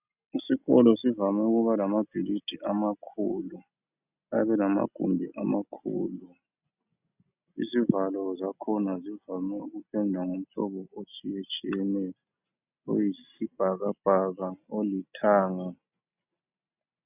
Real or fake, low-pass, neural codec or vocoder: real; 3.6 kHz; none